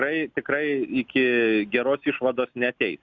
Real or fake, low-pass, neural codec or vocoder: real; 7.2 kHz; none